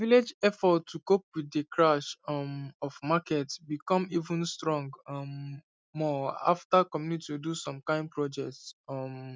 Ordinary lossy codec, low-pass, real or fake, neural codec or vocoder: none; none; real; none